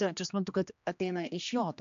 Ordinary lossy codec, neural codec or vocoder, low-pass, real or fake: AAC, 64 kbps; codec, 16 kHz, 2 kbps, X-Codec, HuBERT features, trained on general audio; 7.2 kHz; fake